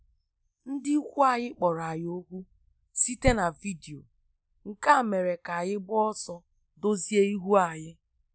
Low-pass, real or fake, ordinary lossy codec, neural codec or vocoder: none; real; none; none